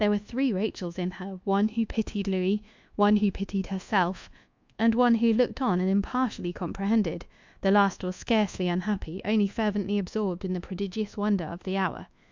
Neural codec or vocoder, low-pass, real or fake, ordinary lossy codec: codec, 24 kHz, 1.2 kbps, DualCodec; 7.2 kHz; fake; MP3, 64 kbps